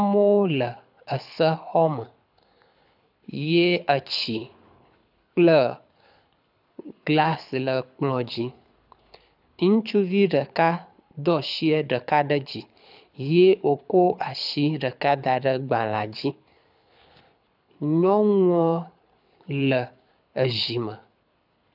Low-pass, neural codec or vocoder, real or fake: 5.4 kHz; codec, 24 kHz, 6 kbps, HILCodec; fake